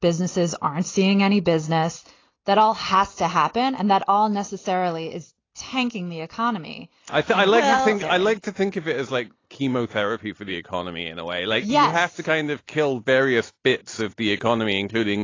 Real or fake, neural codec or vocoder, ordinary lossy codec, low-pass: real; none; AAC, 32 kbps; 7.2 kHz